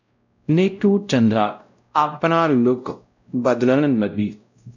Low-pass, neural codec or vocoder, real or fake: 7.2 kHz; codec, 16 kHz, 0.5 kbps, X-Codec, WavLM features, trained on Multilingual LibriSpeech; fake